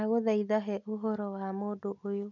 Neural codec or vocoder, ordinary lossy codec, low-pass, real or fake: none; none; 7.2 kHz; real